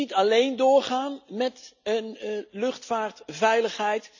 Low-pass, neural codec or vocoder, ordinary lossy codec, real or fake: 7.2 kHz; none; none; real